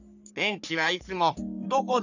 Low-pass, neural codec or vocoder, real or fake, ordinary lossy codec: 7.2 kHz; codec, 44.1 kHz, 3.4 kbps, Pupu-Codec; fake; none